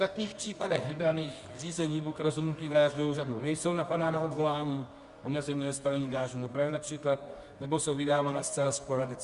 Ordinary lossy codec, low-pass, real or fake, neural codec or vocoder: Opus, 64 kbps; 10.8 kHz; fake; codec, 24 kHz, 0.9 kbps, WavTokenizer, medium music audio release